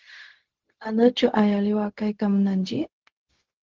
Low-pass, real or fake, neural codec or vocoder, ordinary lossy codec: 7.2 kHz; fake; codec, 16 kHz, 0.4 kbps, LongCat-Audio-Codec; Opus, 16 kbps